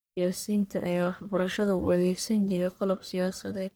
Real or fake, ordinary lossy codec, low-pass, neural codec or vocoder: fake; none; none; codec, 44.1 kHz, 1.7 kbps, Pupu-Codec